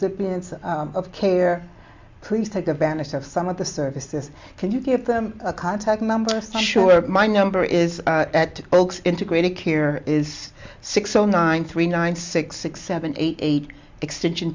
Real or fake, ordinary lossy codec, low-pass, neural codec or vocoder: real; MP3, 64 kbps; 7.2 kHz; none